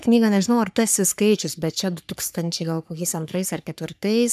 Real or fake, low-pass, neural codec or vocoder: fake; 14.4 kHz; codec, 44.1 kHz, 3.4 kbps, Pupu-Codec